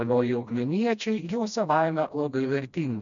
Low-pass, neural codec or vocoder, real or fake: 7.2 kHz; codec, 16 kHz, 1 kbps, FreqCodec, smaller model; fake